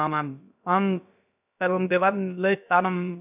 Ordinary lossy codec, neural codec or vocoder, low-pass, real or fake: none; codec, 16 kHz, about 1 kbps, DyCAST, with the encoder's durations; 3.6 kHz; fake